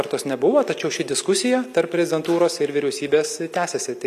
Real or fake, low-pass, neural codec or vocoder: real; 14.4 kHz; none